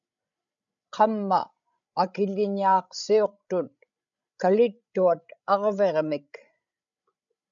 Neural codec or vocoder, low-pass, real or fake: codec, 16 kHz, 16 kbps, FreqCodec, larger model; 7.2 kHz; fake